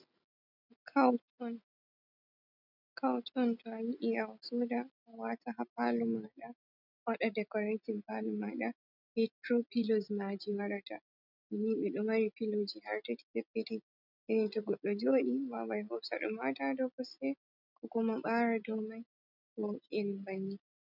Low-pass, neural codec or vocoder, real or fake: 5.4 kHz; none; real